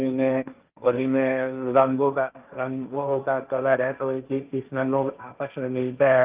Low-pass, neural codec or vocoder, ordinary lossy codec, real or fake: 3.6 kHz; codec, 16 kHz, 1.1 kbps, Voila-Tokenizer; Opus, 24 kbps; fake